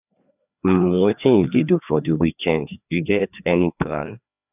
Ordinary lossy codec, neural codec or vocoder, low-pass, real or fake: none; codec, 16 kHz, 2 kbps, FreqCodec, larger model; 3.6 kHz; fake